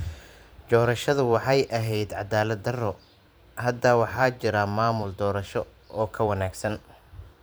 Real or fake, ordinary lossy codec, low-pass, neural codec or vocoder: fake; none; none; vocoder, 44.1 kHz, 128 mel bands every 512 samples, BigVGAN v2